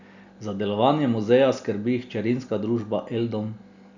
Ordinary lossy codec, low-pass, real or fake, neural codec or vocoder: none; 7.2 kHz; real; none